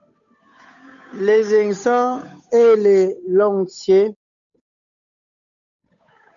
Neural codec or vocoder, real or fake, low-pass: codec, 16 kHz, 8 kbps, FunCodec, trained on Chinese and English, 25 frames a second; fake; 7.2 kHz